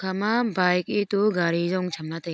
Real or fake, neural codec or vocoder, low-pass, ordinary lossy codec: real; none; none; none